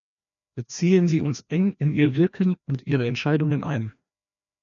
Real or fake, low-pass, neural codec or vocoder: fake; 7.2 kHz; codec, 16 kHz, 1 kbps, FreqCodec, larger model